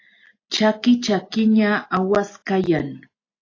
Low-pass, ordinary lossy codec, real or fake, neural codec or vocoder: 7.2 kHz; AAC, 32 kbps; real; none